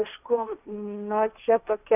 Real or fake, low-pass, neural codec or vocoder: fake; 3.6 kHz; codec, 16 kHz, 1.1 kbps, Voila-Tokenizer